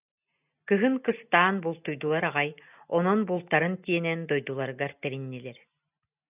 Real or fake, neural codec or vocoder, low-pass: real; none; 3.6 kHz